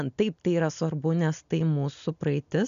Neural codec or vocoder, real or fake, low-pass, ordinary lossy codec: none; real; 7.2 kHz; MP3, 96 kbps